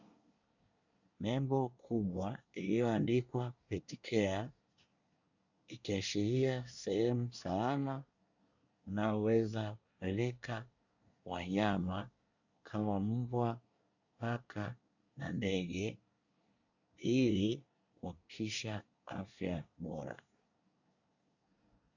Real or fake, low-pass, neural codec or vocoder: fake; 7.2 kHz; codec, 24 kHz, 1 kbps, SNAC